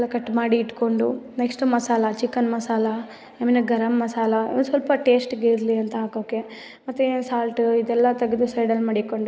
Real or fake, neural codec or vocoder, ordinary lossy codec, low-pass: real; none; none; none